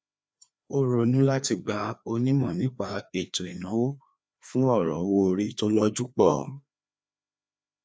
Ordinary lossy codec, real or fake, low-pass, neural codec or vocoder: none; fake; none; codec, 16 kHz, 2 kbps, FreqCodec, larger model